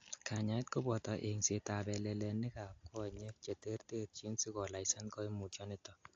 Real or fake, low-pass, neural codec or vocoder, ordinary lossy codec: real; 7.2 kHz; none; AAC, 48 kbps